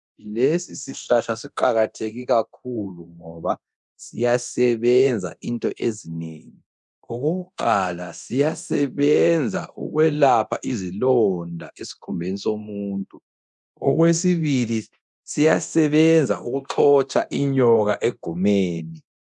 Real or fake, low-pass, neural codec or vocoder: fake; 10.8 kHz; codec, 24 kHz, 0.9 kbps, DualCodec